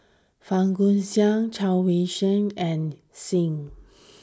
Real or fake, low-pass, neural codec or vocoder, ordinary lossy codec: real; none; none; none